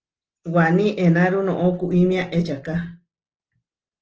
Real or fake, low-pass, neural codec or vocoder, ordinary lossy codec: real; 7.2 kHz; none; Opus, 16 kbps